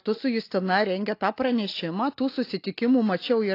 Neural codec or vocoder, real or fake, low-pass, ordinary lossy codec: none; real; 5.4 kHz; AAC, 32 kbps